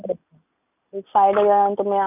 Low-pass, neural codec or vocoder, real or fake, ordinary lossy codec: 3.6 kHz; none; real; none